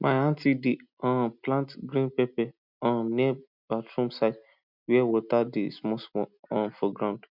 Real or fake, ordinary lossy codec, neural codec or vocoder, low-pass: real; none; none; 5.4 kHz